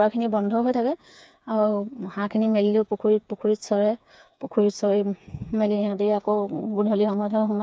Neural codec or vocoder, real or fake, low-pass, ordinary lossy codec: codec, 16 kHz, 4 kbps, FreqCodec, smaller model; fake; none; none